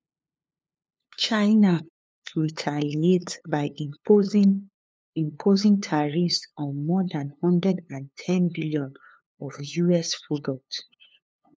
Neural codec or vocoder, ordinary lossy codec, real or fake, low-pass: codec, 16 kHz, 2 kbps, FunCodec, trained on LibriTTS, 25 frames a second; none; fake; none